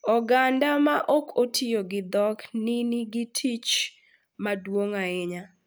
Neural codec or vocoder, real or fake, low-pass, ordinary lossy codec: none; real; none; none